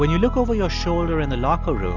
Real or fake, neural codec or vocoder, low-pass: real; none; 7.2 kHz